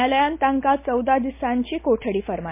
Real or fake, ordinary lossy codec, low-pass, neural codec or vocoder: real; MP3, 24 kbps; 3.6 kHz; none